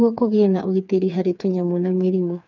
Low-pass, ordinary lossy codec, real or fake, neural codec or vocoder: 7.2 kHz; none; fake; codec, 16 kHz, 4 kbps, FreqCodec, smaller model